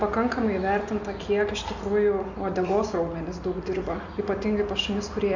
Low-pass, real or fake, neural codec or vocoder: 7.2 kHz; real; none